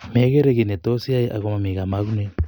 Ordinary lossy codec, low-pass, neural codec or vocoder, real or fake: none; 19.8 kHz; none; real